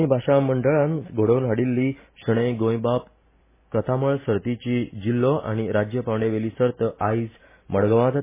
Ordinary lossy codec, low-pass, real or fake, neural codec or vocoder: MP3, 16 kbps; 3.6 kHz; real; none